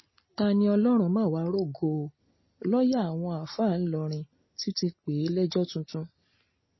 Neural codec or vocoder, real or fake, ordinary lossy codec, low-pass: none; real; MP3, 24 kbps; 7.2 kHz